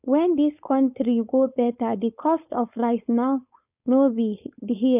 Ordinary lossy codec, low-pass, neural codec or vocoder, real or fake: none; 3.6 kHz; codec, 16 kHz, 4.8 kbps, FACodec; fake